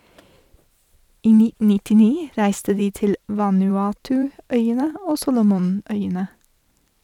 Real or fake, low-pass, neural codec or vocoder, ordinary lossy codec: fake; 19.8 kHz; vocoder, 44.1 kHz, 128 mel bands every 512 samples, BigVGAN v2; none